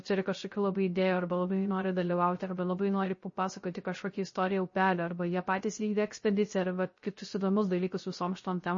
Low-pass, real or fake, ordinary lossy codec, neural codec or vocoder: 7.2 kHz; fake; MP3, 32 kbps; codec, 16 kHz, 0.3 kbps, FocalCodec